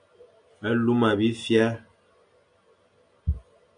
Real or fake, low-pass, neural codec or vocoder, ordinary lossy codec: real; 9.9 kHz; none; MP3, 96 kbps